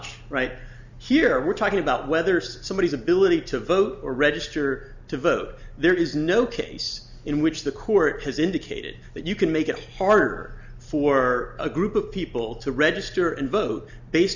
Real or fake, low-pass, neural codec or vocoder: real; 7.2 kHz; none